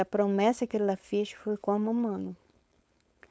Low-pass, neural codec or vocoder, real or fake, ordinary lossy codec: none; codec, 16 kHz, 4.8 kbps, FACodec; fake; none